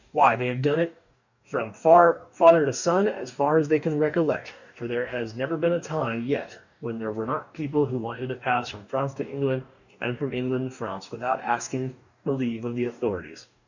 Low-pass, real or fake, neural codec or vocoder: 7.2 kHz; fake; codec, 44.1 kHz, 2.6 kbps, DAC